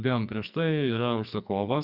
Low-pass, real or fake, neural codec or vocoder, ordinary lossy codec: 5.4 kHz; fake; codec, 44.1 kHz, 2.6 kbps, SNAC; Opus, 64 kbps